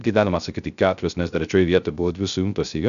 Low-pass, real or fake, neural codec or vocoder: 7.2 kHz; fake; codec, 16 kHz, 0.3 kbps, FocalCodec